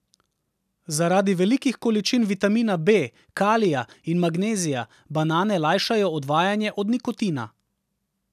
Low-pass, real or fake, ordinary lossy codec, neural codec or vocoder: 14.4 kHz; real; none; none